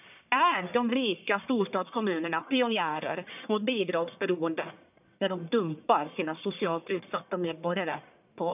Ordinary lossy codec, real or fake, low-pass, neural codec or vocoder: none; fake; 3.6 kHz; codec, 44.1 kHz, 1.7 kbps, Pupu-Codec